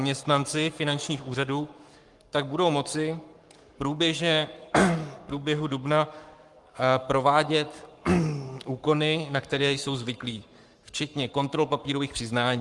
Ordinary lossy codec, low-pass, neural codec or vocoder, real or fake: Opus, 32 kbps; 10.8 kHz; codec, 44.1 kHz, 7.8 kbps, Pupu-Codec; fake